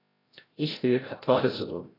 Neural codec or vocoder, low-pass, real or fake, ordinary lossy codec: codec, 16 kHz, 0.5 kbps, FreqCodec, larger model; 5.4 kHz; fake; AAC, 24 kbps